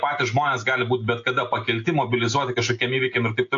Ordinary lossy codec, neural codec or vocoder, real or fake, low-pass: AAC, 48 kbps; none; real; 7.2 kHz